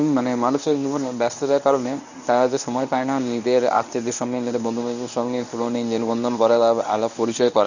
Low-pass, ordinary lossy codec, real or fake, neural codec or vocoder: 7.2 kHz; none; fake; codec, 24 kHz, 0.9 kbps, WavTokenizer, medium speech release version 1